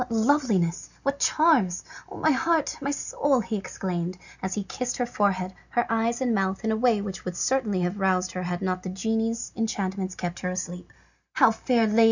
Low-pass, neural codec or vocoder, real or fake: 7.2 kHz; none; real